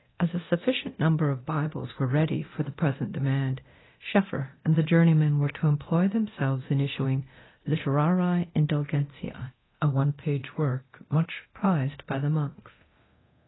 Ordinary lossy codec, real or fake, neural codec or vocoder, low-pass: AAC, 16 kbps; fake; codec, 24 kHz, 0.9 kbps, DualCodec; 7.2 kHz